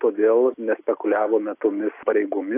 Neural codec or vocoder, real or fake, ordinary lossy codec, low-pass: none; real; AAC, 32 kbps; 3.6 kHz